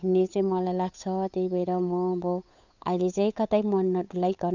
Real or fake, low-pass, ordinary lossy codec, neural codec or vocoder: fake; 7.2 kHz; none; codec, 16 kHz, 8 kbps, FunCodec, trained on Chinese and English, 25 frames a second